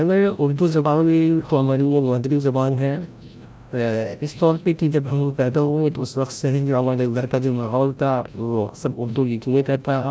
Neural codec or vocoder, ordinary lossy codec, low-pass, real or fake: codec, 16 kHz, 0.5 kbps, FreqCodec, larger model; none; none; fake